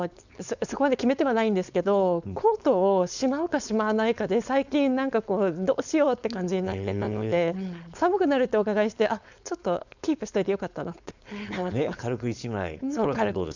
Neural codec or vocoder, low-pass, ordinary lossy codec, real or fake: codec, 16 kHz, 4.8 kbps, FACodec; 7.2 kHz; none; fake